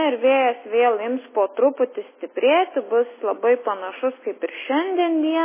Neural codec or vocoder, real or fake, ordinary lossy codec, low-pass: none; real; MP3, 16 kbps; 3.6 kHz